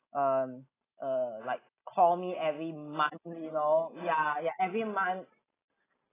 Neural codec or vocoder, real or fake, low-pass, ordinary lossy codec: none; real; 3.6 kHz; AAC, 16 kbps